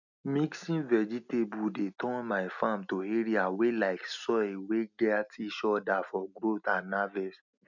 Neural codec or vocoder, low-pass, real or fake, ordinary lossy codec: none; 7.2 kHz; real; none